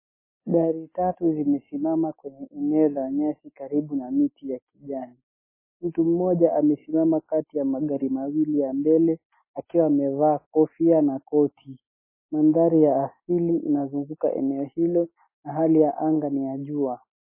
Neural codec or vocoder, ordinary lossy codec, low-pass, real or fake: none; MP3, 16 kbps; 3.6 kHz; real